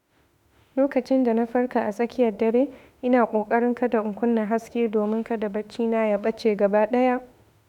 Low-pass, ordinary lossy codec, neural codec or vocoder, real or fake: 19.8 kHz; none; autoencoder, 48 kHz, 32 numbers a frame, DAC-VAE, trained on Japanese speech; fake